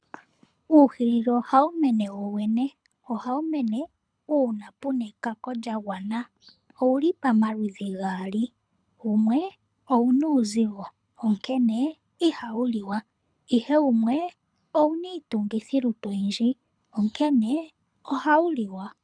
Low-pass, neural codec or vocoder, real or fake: 9.9 kHz; codec, 24 kHz, 6 kbps, HILCodec; fake